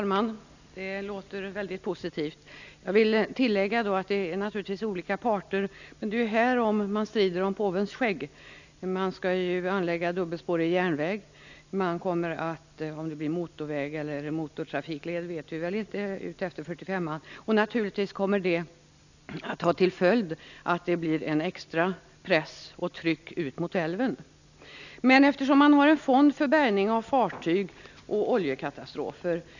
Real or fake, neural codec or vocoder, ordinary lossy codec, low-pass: real; none; none; 7.2 kHz